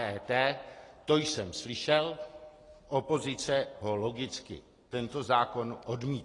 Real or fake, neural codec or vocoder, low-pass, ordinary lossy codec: real; none; 10.8 kHz; AAC, 32 kbps